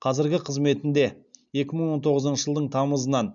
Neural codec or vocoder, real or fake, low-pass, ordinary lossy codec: none; real; 7.2 kHz; none